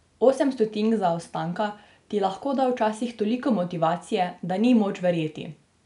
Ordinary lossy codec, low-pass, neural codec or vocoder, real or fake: none; 10.8 kHz; none; real